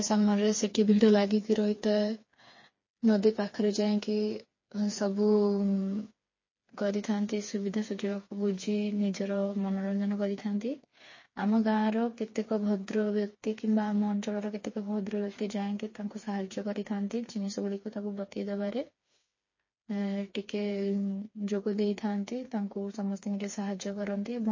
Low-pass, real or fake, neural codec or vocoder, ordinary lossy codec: 7.2 kHz; fake; codec, 16 kHz, 4 kbps, FreqCodec, smaller model; MP3, 32 kbps